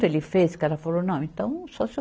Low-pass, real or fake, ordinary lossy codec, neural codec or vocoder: none; real; none; none